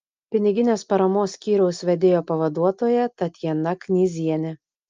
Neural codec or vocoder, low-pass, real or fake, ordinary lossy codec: none; 7.2 kHz; real; Opus, 24 kbps